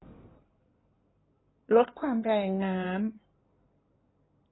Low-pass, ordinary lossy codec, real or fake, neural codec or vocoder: 7.2 kHz; AAC, 16 kbps; fake; codec, 16 kHz in and 24 kHz out, 2.2 kbps, FireRedTTS-2 codec